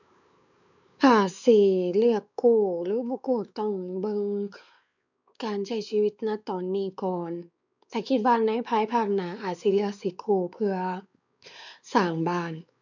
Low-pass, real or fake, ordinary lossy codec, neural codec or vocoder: 7.2 kHz; fake; none; codec, 16 kHz, 4 kbps, X-Codec, WavLM features, trained on Multilingual LibriSpeech